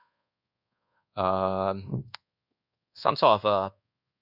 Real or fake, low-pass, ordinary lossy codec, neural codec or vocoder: fake; 5.4 kHz; none; codec, 24 kHz, 1.2 kbps, DualCodec